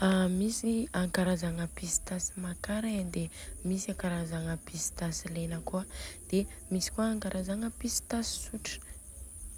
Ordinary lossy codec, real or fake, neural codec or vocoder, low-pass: none; real; none; none